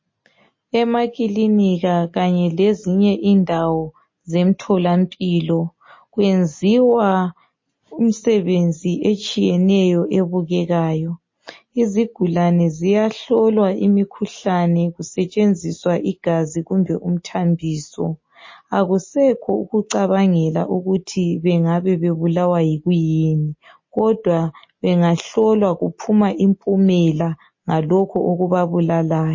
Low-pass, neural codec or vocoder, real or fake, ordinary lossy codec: 7.2 kHz; none; real; MP3, 32 kbps